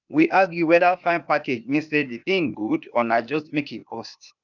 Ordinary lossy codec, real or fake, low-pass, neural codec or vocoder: none; fake; 7.2 kHz; codec, 16 kHz, 0.8 kbps, ZipCodec